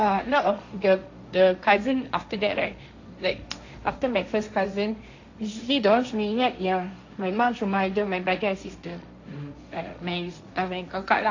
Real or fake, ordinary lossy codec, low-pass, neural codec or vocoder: fake; AAC, 48 kbps; 7.2 kHz; codec, 16 kHz, 1.1 kbps, Voila-Tokenizer